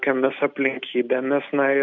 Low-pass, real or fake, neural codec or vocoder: 7.2 kHz; real; none